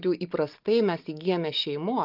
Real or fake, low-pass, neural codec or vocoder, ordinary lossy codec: real; 5.4 kHz; none; Opus, 24 kbps